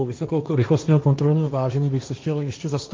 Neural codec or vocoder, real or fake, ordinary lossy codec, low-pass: codec, 16 kHz, 1.1 kbps, Voila-Tokenizer; fake; Opus, 32 kbps; 7.2 kHz